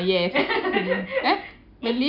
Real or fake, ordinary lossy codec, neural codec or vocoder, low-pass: real; none; none; 5.4 kHz